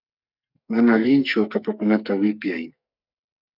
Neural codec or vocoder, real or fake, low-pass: codec, 44.1 kHz, 2.6 kbps, SNAC; fake; 5.4 kHz